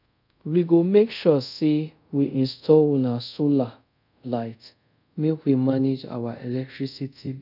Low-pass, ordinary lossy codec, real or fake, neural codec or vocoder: 5.4 kHz; none; fake; codec, 24 kHz, 0.5 kbps, DualCodec